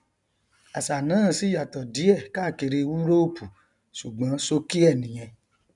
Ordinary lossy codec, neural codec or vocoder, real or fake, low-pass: none; none; real; 10.8 kHz